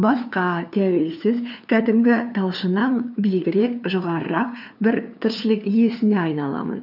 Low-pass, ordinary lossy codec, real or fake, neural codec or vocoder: 5.4 kHz; none; fake; codec, 16 kHz, 2 kbps, FunCodec, trained on LibriTTS, 25 frames a second